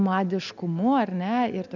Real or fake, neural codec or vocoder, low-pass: real; none; 7.2 kHz